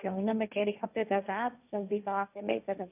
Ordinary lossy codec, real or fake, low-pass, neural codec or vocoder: none; fake; 3.6 kHz; codec, 16 kHz, 1.1 kbps, Voila-Tokenizer